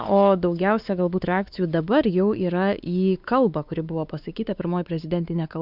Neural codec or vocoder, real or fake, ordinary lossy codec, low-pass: codec, 16 kHz, 4 kbps, X-Codec, WavLM features, trained on Multilingual LibriSpeech; fake; Opus, 64 kbps; 5.4 kHz